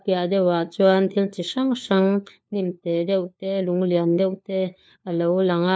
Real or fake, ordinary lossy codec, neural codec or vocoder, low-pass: fake; none; codec, 16 kHz, 4 kbps, FunCodec, trained on LibriTTS, 50 frames a second; none